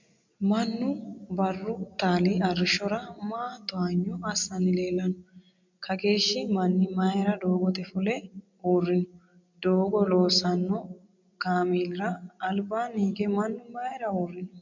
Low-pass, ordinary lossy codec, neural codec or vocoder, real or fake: 7.2 kHz; MP3, 64 kbps; none; real